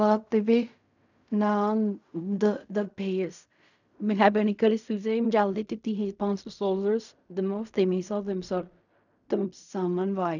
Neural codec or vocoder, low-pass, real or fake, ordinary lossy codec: codec, 16 kHz in and 24 kHz out, 0.4 kbps, LongCat-Audio-Codec, fine tuned four codebook decoder; 7.2 kHz; fake; none